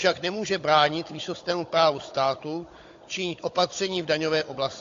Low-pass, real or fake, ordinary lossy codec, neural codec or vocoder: 7.2 kHz; fake; AAC, 48 kbps; codec, 16 kHz, 16 kbps, FunCodec, trained on Chinese and English, 50 frames a second